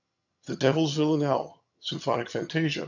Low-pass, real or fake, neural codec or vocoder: 7.2 kHz; fake; vocoder, 22.05 kHz, 80 mel bands, HiFi-GAN